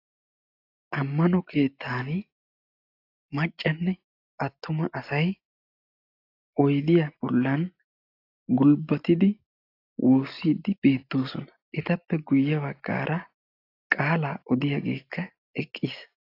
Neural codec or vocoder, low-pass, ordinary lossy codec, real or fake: none; 5.4 kHz; AAC, 32 kbps; real